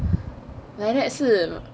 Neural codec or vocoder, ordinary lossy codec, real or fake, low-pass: none; none; real; none